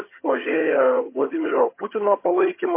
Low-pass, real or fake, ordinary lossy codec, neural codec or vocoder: 3.6 kHz; fake; MP3, 24 kbps; vocoder, 22.05 kHz, 80 mel bands, HiFi-GAN